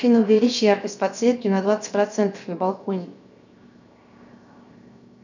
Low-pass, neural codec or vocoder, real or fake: 7.2 kHz; codec, 16 kHz, 0.7 kbps, FocalCodec; fake